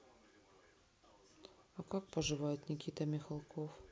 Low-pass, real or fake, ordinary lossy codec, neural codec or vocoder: none; real; none; none